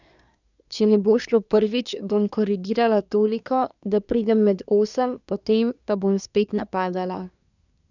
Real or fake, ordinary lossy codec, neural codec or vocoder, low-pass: fake; none; codec, 24 kHz, 1 kbps, SNAC; 7.2 kHz